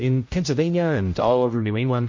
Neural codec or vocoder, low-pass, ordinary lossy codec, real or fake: codec, 16 kHz, 0.5 kbps, X-Codec, HuBERT features, trained on general audio; 7.2 kHz; MP3, 48 kbps; fake